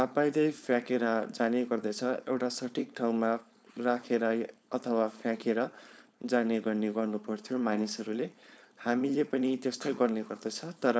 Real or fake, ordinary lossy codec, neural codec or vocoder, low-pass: fake; none; codec, 16 kHz, 4.8 kbps, FACodec; none